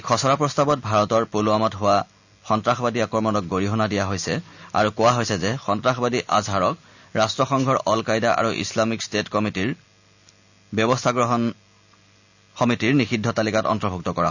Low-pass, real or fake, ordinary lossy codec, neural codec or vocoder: 7.2 kHz; real; none; none